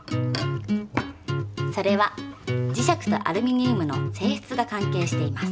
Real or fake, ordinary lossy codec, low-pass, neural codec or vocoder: real; none; none; none